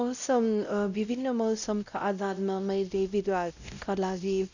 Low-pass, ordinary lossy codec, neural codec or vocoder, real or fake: 7.2 kHz; none; codec, 16 kHz, 0.5 kbps, X-Codec, WavLM features, trained on Multilingual LibriSpeech; fake